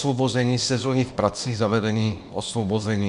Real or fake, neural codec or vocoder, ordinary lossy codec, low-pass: fake; codec, 24 kHz, 0.9 kbps, WavTokenizer, small release; Opus, 64 kbps; 10.8 kHz